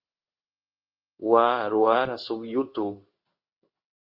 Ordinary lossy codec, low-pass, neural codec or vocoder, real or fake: Opus, 24 kbps; 5.4 kHz; codec, 16 kHz in and 24 kHz out, 1 kbps, XY-Tokenizer; fake